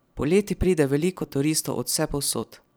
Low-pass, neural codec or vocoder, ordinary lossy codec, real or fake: none; vocoder, 44.1 kHz, 128 mel bands every 256 samples, BigVGAN v2; none; fake